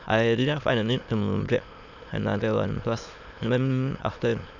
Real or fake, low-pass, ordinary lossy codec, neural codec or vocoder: fake; 7.2 kHz; none; autoencoder, 22.05 kHz, a latent of 192 numbers a frame, VITS, trained on many speakers